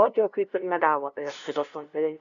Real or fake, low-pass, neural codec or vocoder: fake; 7.2 kHz; codec, 16 kHz, 0.5 kbps, FunCodec, trained on LibriTTS, 25 frames a second